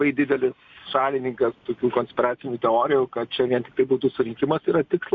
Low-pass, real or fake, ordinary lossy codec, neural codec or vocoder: 7.2 kHz; real; MP3, 48 kbps; none